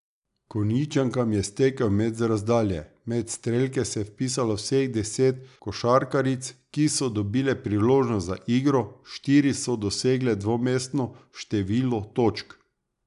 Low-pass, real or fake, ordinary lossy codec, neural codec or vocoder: 10.8 kHz; real; none; none